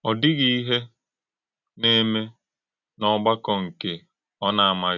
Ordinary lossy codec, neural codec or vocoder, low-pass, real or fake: none; none; 7.2 kHz; real